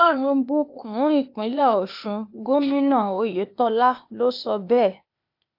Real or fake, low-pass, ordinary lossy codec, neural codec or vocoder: fake; 5.4 kHz; AAC, 48 kbps; codec, 16 kHz, 0.8 kbps, ZipCodec